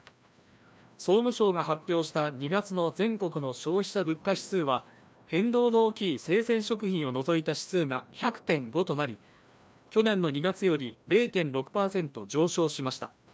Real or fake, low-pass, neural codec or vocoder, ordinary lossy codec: fake; none; codec, 16 kHz, 1 kbps, FreqCodec, larger model; none